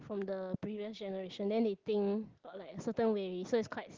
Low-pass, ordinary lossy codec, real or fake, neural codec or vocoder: 7.2 kHz; Opus, 16 kbps; real; none